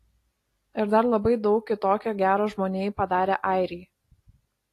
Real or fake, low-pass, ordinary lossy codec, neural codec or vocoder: real; 14.4 kHz; AAC, 48 kbps; none